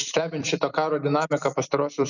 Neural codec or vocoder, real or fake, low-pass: vocoder, 44.1 kHz, 128 mel bands every 256 samples, BigVGAN v2; fake; 7.2 kHz